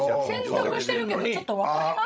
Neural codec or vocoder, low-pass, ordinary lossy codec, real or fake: codec, 16 kHz, 16 kbps, FreqCodec, larger model; none; none; fake